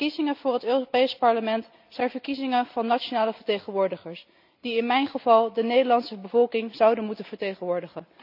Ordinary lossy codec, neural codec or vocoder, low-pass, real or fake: none; none; 5.4 kHz; real